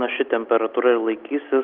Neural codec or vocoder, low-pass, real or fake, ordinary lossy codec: none; 5.4 kHz; real; Opus, 32 kbps